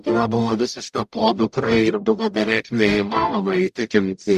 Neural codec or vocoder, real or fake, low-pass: codec, 44.1 kHz, 0.9 kbps, DAC; fake; 14.4 kHz